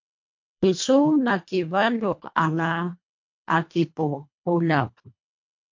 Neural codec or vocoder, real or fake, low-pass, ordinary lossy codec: codec, 24 kHz, 1.5 kbps, HILCodec; fake; 7.2 kHz; MP3, 48 kbps